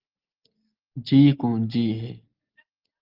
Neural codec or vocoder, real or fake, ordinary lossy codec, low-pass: none; real; Opus, 32 kbps; 5.4 kHz